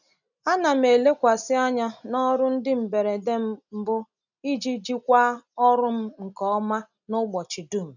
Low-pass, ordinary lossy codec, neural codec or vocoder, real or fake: 7.2 kHz; none; none; real